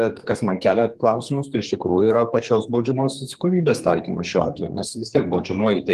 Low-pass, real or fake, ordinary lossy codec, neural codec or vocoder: 14.4 kHz; fake; Opus, 24 kbps; codec, 32 kHz, 1.9 kbps, SNAC